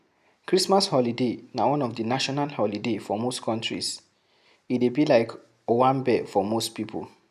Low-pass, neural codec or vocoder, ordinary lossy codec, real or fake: 14.4 kHz; none; none; real